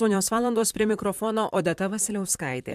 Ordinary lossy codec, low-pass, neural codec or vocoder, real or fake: MP3, 96 kbps; 14.4 kHz; vocoder, 44.1 kHz, 128 mel bands, Pupu-Vocoder; fake